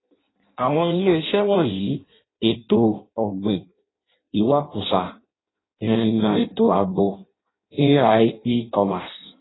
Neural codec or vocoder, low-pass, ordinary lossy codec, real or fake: codec, 16 kHz in and 24 kHz out, 0.6 kbps, FireRedTTS-2 codec; 7.2 kHz; AAC, 16 kbps; fake